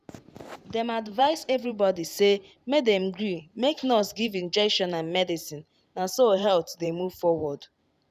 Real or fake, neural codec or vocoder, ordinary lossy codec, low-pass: fake; vocoder, 44.1 kHz, 128 mel bands every 512 samples, BigVGAN v2; none; 14.4 kHz